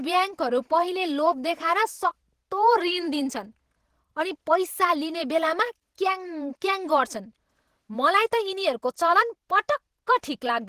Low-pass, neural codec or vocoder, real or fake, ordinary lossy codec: 14.4 kHz; vocoder, 48 kHz, 128 mel bands, Vocos; fake; Opus, 16 kbps